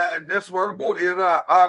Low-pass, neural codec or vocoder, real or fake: 10.8 kHz; codec, 24 kHz, 0.9 kbps, WavTokenizer, medium speech release version 1; fake